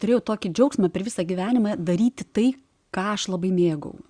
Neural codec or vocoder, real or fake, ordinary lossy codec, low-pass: none; real; Opus, 64 kbps; 9.9 kHz